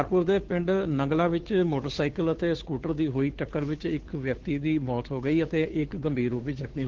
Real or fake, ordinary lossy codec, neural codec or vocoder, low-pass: fake; Opus, 16 kbps; codec, 16 kHz, 2 kbps, FunCodec, trained on Chinese and English, 25 frames a second; 7.2 kHz